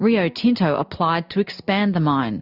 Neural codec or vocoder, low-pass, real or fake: none; 5.4 kHz; real